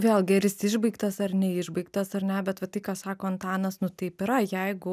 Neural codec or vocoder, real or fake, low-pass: none; real; 14.4 kHz